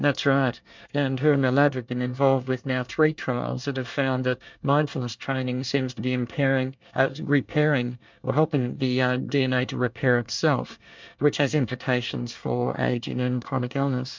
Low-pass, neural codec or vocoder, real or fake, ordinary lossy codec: 7.2 kHz; codec, 24 kHz, 1 kbps, SNAC; fake; MP3, 64 kbps